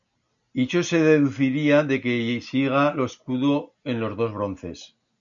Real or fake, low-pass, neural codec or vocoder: real; 7.2 kHz; none